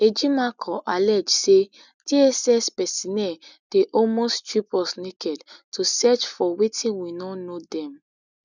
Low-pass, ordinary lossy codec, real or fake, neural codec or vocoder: 7.2 kHz; none; real; none